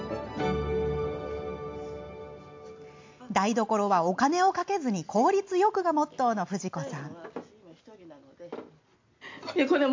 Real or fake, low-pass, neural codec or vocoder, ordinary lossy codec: real; 7.2 kHz; none; MP3, 48 kbps